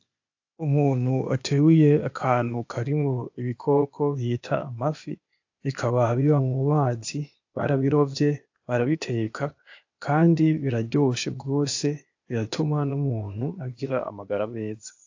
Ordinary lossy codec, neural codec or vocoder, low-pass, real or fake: AAC, 48 kbps; codec, 16 kHz, 0.8 kbps, ZipCodec; 7.2 kHz; fake